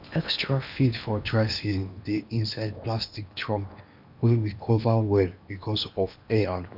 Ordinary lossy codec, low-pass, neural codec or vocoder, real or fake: none; 5.4 kHz; codec, 16 kHz in and 24 kHz out, 0.8 kbps, FocalCodec, streaming, 65536 codes; fake